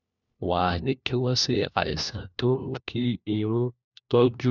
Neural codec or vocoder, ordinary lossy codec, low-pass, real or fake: codec, 16 kHz, 1 kbps, FunCodec, trained on LibriTTS, 50 frames a second; none; 7.2 kHz; fake